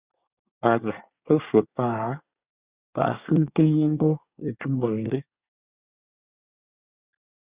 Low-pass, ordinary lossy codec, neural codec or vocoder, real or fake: 3.6 kHz; Opus, 64 kbps; codec, 24 kHz, 1 kbps, SNAC; fake